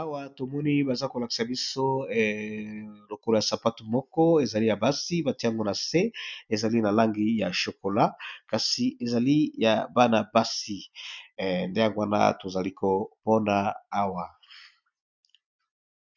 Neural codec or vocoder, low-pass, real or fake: none; 7.2 kHz; real